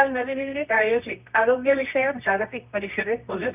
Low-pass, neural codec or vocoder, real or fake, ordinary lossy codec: 3.6 kHz; codec, 24 kHz, 0.9 kbps, WavTokenizer, medium music audio release; fake; none